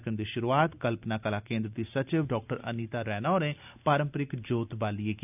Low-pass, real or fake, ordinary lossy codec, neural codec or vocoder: 3.6 kHz; real; none; none